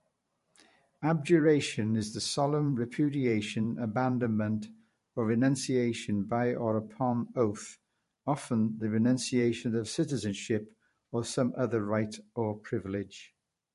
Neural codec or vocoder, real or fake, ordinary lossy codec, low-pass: vocoder, 44.1 kHz, 128 mel bands every 512 samples, BigVGAN v2; fake; MP3, 48 kbps; 14.4 kHz